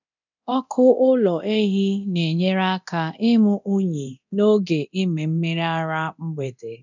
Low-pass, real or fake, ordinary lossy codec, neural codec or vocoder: 7.2 kHz; fake; none; codec, 24 kHz, 0.9 kbps, DualCodec